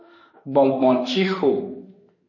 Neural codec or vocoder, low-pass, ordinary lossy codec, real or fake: autoencoder, 48 kHz, 32 numbers a frame, DAC-VAE, trained on Japanese speech; 7.2 kHz; MP3, 32 kbps; fake